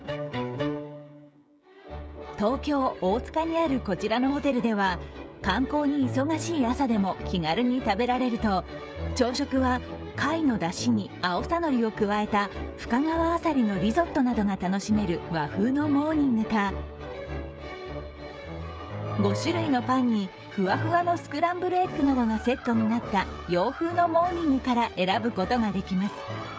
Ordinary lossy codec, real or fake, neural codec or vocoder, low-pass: none; fake; codec, 16 kHz, 16 kbps, FreqCodec, smaller model; none